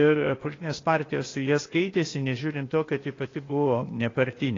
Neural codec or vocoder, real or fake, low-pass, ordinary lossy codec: codec, 16 kHz, 0.8 kbps, ZipCodec; fake; 7.2 kHz; AAC, 32 kbps